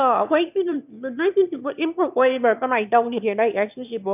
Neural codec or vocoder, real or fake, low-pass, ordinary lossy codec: autoencoder, 22.05 kHz, a latent of 192 numbers a frame, VITS, trained on one speaker; fake; 3.6 kHz; none